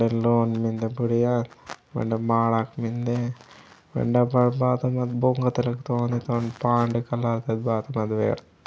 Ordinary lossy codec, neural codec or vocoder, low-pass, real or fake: none; none; none; real